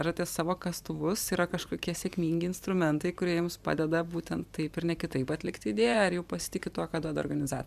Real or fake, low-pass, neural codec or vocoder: fake; 14.4 kHz; vocoder, 44.1 kHz, 128 mel bands every 512 samples, BigVGAN v2